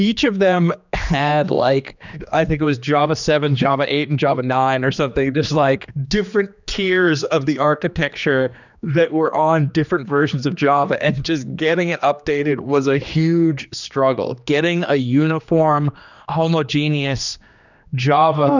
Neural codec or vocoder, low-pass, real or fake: codec, 16 kHz, 2 kbps, X-Codec, HuBERT features, trained on general audio; 7.2 kHz; fake